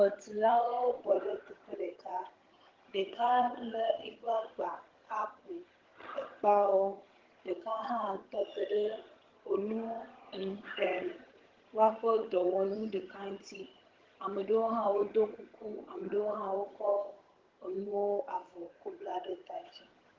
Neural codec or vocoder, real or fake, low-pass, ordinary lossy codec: vocoder, 22.05 kHz, 80 mel bands, HiFi-GAN; fake; 7.2 kHz; Opus, 16 kbps